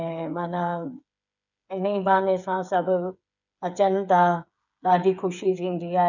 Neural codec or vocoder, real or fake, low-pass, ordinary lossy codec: codec, 16 kHz, 8 kbps, FreqCodec, smaller model; fake; 7.2 kHz; none